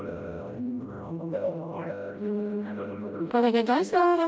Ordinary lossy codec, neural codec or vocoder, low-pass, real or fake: none; codec, 16 kHz, 0.5 kbps, FreqCodec, smaller model; none; fake